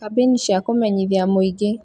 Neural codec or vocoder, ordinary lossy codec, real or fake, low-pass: none; none; real; 10.8 kHz